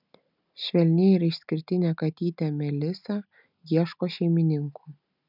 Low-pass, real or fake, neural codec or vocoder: 5.4 kHz; real; none